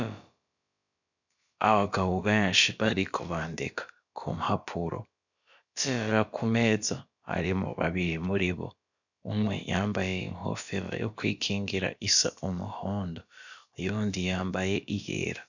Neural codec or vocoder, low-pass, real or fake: codec, 16 kHz, about 1 kbps, DyCAST, with the encoder's durations; 7.2 kHz; fake